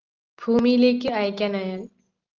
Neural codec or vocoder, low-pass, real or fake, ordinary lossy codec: none; 7.2 kHz; real; Opus, 32 kbps